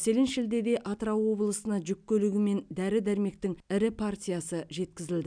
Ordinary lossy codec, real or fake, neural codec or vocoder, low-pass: none; real; none; 9.9 kHz